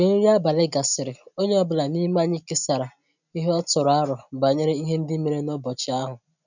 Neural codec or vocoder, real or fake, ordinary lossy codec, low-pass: none; real; none; 7.2 kHz